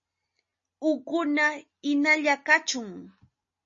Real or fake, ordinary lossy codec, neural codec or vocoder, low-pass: real; MP3, 32 kbps; none; 7.2 kHz